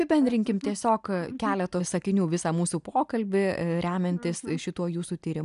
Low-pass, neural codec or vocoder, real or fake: 10.8 kHz; none; real